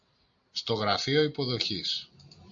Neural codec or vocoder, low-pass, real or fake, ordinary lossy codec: none; 7.2 kHz; real; AAC, 64 kbps